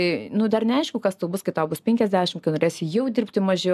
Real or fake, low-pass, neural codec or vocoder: real; 14.4 kHz; none